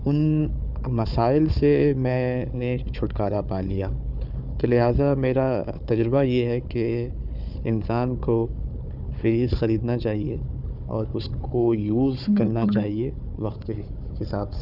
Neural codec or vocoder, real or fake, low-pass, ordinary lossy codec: codec, 16 kHz, 4 kbps, FunCodec, trained on Chinese and English, 50 frames a second; fake; 5.4 kHz; none